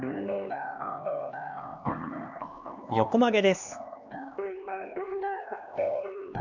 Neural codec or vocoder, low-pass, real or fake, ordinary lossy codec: codec, 16 kHz, 2 kbps, X-Codec, HuBERT features, trained on LibriSpeech; 7.2 kHz; fake; none